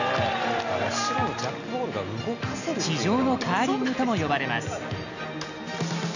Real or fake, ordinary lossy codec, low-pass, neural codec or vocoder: real; none; 7.2 kHz; none